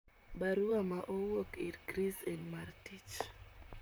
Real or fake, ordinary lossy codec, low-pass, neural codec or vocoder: fake; none; none; vocoder, 44.1 kHz, 128 mel bands, Pupu-Vocoder